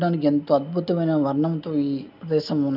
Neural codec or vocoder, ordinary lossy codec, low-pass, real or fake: none; none; 5.4 kHz; real